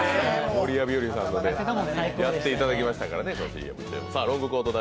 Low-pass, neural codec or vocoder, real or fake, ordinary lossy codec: none; none; real; none